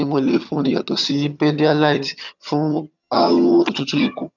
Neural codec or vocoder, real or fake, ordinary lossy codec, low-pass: vocoder, 22.05 kHz, 80 mel bands, HiFi-GAN; fake; none; 7.2 kHz